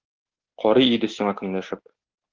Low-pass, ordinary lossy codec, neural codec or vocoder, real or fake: 7.2 kHz; Opus, 16 kbps; none; real